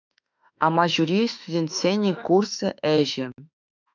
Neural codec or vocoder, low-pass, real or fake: autoencoder, 48 kHz, 32 numbers a frame, DAC-VAE, trained on Japanese speech; 7.2 kHz; fake